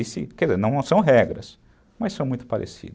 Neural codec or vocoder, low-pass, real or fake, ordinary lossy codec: none; none; real; none